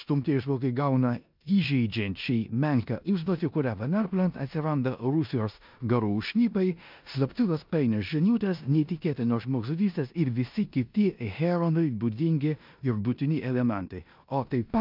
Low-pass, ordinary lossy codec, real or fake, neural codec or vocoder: 5.4 kHz; MP3, 48 kbps; fake; codec, 16 kHz in and 24 kHz out, 0.9 kbps, LongCat-Audio-Codec, four codebook decoder